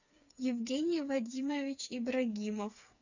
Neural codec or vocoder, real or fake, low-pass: codec, 16 kHz, 4 kbps, FreqCodec, smaller model; fake; 7.2 kHz